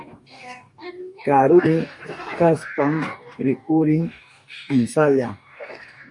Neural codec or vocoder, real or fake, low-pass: codec, 44.1 kHz, 2.6 kbps, DAC; fake; 10.8 kHz